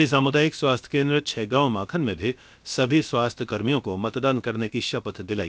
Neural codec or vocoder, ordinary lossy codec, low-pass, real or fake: codec, 16 kHz, about 1 kbps, DyCAST, with the encoder's durations; none; none; fake